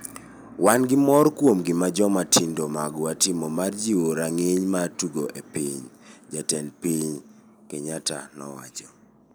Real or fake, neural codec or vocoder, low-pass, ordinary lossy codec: real; none; none; none